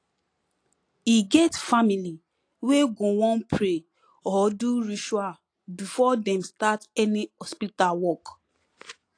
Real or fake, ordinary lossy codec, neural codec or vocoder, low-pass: real; AAC, 48 kbps; none; 9.9 kHz